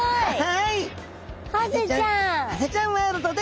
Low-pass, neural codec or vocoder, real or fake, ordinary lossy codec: none; none; real; none